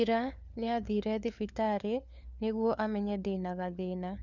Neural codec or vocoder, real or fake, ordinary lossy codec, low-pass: codec, 16 kHz, 4 kbps, FunCodec, trained on LibriTTS, 50 frames a second; fake; none; 7.2 kHz